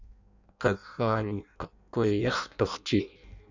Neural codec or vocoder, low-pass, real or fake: codec, 16 kHz in and 24 kHz out, 0.6 kbps, FireRedTTS-2 codec; 7.2 kHz; fake